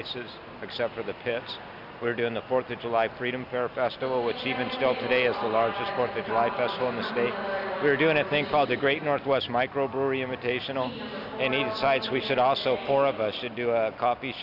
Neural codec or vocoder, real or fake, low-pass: none; real; 5.4 kHz